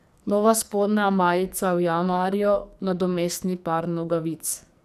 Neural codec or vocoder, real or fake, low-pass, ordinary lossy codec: codec, 44.1 kHz, 2.6 kbps, SNAC; fake; 14.4 kHz; none